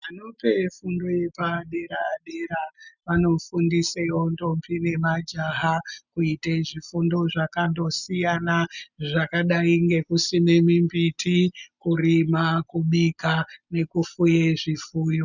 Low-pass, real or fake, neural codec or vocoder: 7.2 kHz; real; none